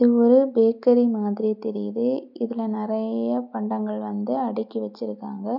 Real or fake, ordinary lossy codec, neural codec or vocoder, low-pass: real; none; none; 5.4 kHz